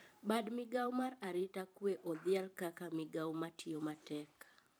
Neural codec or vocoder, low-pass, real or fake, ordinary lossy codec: vocoder, 44.1 kHz, 128 mel bands every 512 samples, BigVGAN v2; none; fake; none